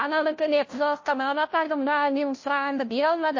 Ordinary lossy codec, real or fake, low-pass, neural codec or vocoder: MP3, 32 kbps; fake; 7.2 kHz; codec, 16 kHz, 0.5 kbps, FunCodec, trained on Chinese and English, 25 frames a second